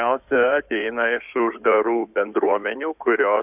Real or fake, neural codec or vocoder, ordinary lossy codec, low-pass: fake; codec, 16 kHz in and 24 kHz out, 2.2 kbps, FireRedTTS-2 codec; AAC, 32 kbps; 3.6 kHz